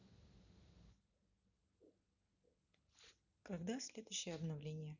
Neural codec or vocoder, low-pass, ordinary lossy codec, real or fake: none; 7.2 kHz; none; real